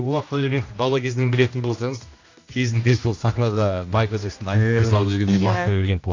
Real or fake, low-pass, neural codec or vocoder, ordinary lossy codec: fake; 7.2 kHz; codec, 16 kHz, 1 kbps, X-Codec, HuBERT features, trained on general audio; AAC, 48 kbps